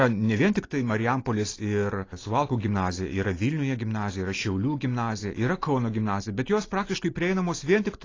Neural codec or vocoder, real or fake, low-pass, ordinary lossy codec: none; real; 7.2 kHz; AAC, 32 kbps